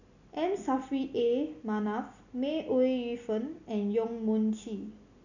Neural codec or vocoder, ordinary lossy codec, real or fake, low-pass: none; none; real; 7.2 kHz